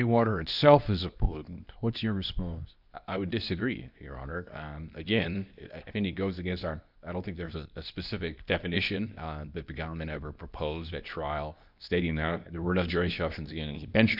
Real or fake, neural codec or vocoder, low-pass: fake; codec, 24 kHz, 0.9 kbps, WavTokenizer, medium speech release version 2; 5.4 kHz